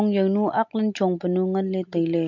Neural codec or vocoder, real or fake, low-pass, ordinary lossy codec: none; real; 7.2 kHz; MP3, 48 kbps